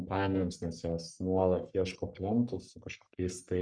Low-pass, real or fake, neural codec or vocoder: 9.9 kHz; fake; codec, 44.1 kHz, 3.4 kbps, Pupu-Codec